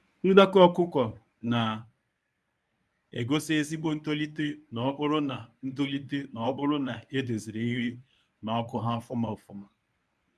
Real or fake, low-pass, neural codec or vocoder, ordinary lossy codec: fake; none; codec, 24 kHz, 0.9 kbps, WavTokenizer, medium speech release version 1; none